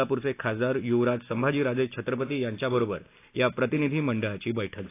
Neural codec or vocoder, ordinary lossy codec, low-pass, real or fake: none; AAC, 24 kbps; 3.6 kHz; real